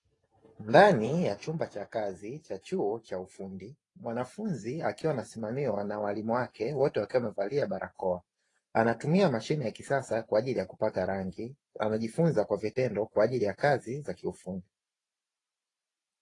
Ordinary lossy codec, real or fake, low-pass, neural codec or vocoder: AAC, 32 kbps; fake; 10.8 kHz; vocoder, 44.1 kHz, 128 mel bands every 512 samples, BigVGAN v2